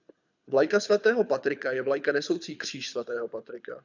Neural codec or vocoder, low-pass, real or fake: codec, 24 kHz, 6 kbps, HILCodec; 7.2 kHz; fake